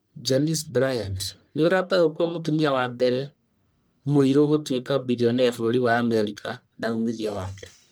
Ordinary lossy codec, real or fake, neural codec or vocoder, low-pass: none; fake; codec, 44.1 kHz, 1.7 kbps, Pupu-Codec; none